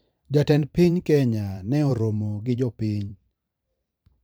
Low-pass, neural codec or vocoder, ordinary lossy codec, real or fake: none; vocoder, 44.1 kHz, 128 mel bands every 512 samples, BigVGAN v2; none; fake